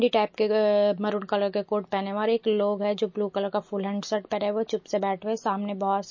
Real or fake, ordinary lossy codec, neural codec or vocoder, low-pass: real; MP3, 32 kbps; none; 7.2 kHz